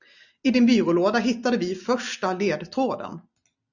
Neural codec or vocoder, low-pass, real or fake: none; 7.2 kHz; real